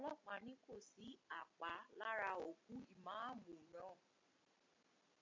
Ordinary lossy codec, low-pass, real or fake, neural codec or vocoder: MP3, 48 kbps; 7.2 kHz; real; none